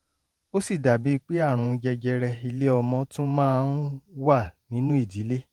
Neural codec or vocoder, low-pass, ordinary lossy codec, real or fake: vocoder, 48 kHz, 128 mel bands, Vocos; 19.8 kHz; Opus, 32 kbps; fake